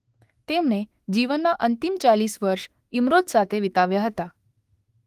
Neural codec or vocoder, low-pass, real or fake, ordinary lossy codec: autoencoder, 48 kHz, 32 numbers a frame, DAC-VAE, trained on Japanese speech; 14.4 kHz; fake; Opus, 24 kbps